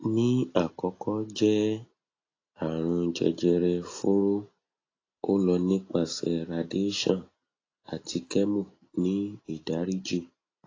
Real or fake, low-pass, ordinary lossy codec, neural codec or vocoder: real; 7.2 kHz; AAC, 32 kbps; none